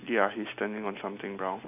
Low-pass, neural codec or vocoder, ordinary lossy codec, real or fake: 3.6 kHz; none; none; real